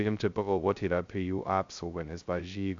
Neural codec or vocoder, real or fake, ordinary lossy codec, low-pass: codec, 16 kHz, 0.2 kbps, FocalCodec; fake; AAC, 64 kbps; 7.2 kHz